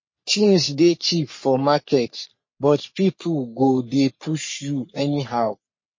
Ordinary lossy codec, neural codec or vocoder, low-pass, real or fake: MP3, 32 kbps; codec, 44.1 kHz, 3.4 kbps, Pupu-Codec; 7.2 kHz; fake